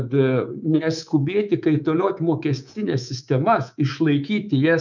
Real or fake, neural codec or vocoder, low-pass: fake; codec, 24 kHz, 3.1 kbps, DualCodec; 7.2 kHz